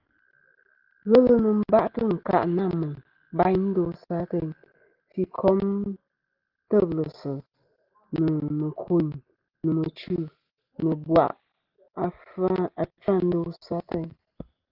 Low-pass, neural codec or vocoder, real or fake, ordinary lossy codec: 5.4 kHz; none; real; Opus, 16 kbps